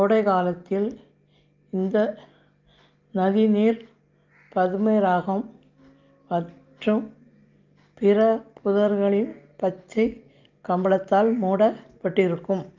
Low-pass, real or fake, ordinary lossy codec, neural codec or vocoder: 7.2 kHz; real; Opus, 24 kbps; none